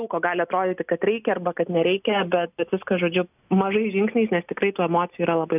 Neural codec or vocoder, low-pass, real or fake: none; 3.6 kHz; real